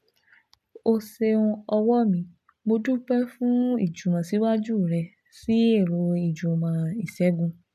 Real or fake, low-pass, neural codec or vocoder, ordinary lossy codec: real; 14.4 kHz; none; none